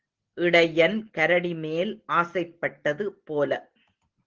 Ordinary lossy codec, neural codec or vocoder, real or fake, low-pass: Opus, 16 kbps; none; real; 7.2 kHz